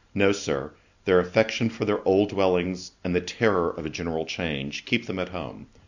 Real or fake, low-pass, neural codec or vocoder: real; 7.2 kHz; none